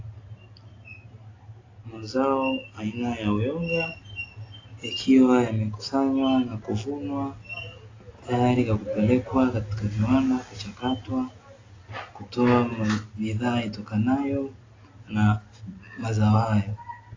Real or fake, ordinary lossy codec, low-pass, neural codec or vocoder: real; AAC, 32 kbps; 7.2 kHz; none